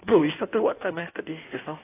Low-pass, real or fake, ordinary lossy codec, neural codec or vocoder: 3.6 kHz; fake; AAC, 16 kbps; codec, 16 kHz in and 24 kHz out, 1.1 kbps, FireRedTTS-2 codec